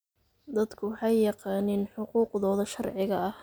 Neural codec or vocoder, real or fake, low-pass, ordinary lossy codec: none; real; none; none